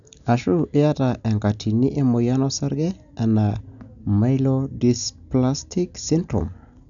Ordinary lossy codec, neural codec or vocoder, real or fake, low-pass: none; none; real; 7.2 kHz